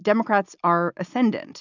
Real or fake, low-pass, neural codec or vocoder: real; 7.2 kHz; none